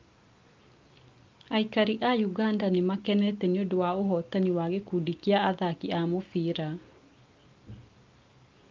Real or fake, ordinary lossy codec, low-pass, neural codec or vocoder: real; Opus, 24 kbps; 7.2 kHz; none